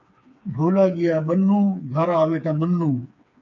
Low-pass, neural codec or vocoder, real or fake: 7.2 kHz; codec, 16 kHz, 4 kbps, FreqCodec, smaller model; fake